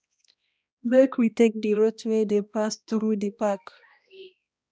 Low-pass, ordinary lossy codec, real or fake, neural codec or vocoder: none; none; fake; codec, 16 kHz, 1 kbps, X-Codec, HuBERT features, trained on balanced general audio